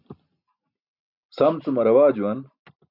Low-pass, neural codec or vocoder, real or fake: 5.4 kHz; none; real